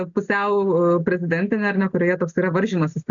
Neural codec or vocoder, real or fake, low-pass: none; real; 7.2 kHz